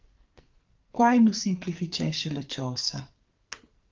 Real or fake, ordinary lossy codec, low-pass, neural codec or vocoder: fake; Opus, 24 kbps; 7.2 kHz; codec, 44.1 kHz, 2.6 kbps, SNAC